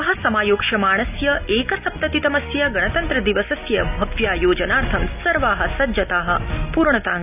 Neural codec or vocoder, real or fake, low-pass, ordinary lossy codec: none; real; 3.6 kHz; none